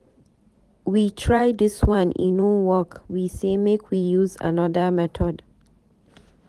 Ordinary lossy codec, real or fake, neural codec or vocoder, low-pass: Opus, 24 kbps; fake; vocoder, 44.1 kHz, 128 mel bands every 512 samples, BigVGAN v2; 14.4 kHz